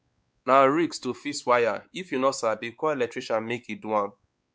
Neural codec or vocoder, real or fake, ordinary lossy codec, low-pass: codec, 16 kHz, 4 kbps, X-Codec, WavLM features, trained on Multilingual LibriSpeech; fake; none; none